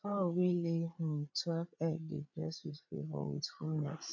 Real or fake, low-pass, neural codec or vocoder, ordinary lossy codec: fake; 7.2 kHz; codec, 16 kHz, 8 kbps, FreqCodec, larger model; none